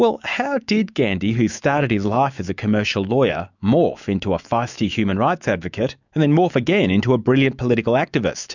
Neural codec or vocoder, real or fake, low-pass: autoencoder, 48 kHz, 128 numbers a frame, DAC-VAE, trained on Japanese speech; fake; 7.2 kHz